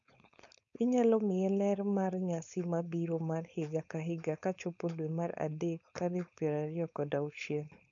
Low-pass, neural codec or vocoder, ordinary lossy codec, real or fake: 7.2 kHz; codec, 16 kHz, 4.8 kbps, FACodec; none; fake